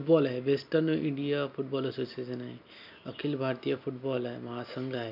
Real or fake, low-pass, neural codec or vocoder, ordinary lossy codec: real; 5.4 kHz; none; none